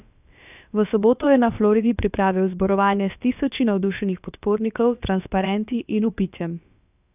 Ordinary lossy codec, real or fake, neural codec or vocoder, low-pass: none; fake; codec, 16 kHz, about 1 kbps, DyCAST, with the encoder's durations; 3.6 kHz